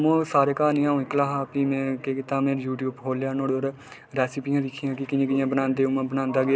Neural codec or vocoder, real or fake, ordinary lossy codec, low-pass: none; real; none; none